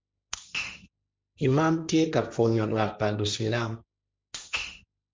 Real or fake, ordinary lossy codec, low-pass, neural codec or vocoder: fake; none; none; codec, 16 kHz, 1.1 kbps, Voila-Tokenizer